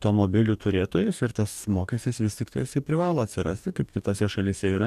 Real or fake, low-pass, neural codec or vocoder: fake; 14.4 kHz; codec, 44.1 kHz, 2.6 kbps, DAC